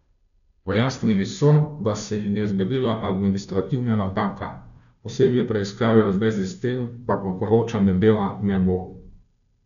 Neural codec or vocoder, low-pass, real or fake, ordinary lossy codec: codec, 16 kHz, 0.5 kbps, FunCodec, trained on Chinese and English, 25 frames a second; 7.2 kHz; fake; none